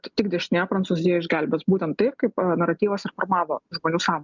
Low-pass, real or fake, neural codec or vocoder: 7.2 kHz; real; none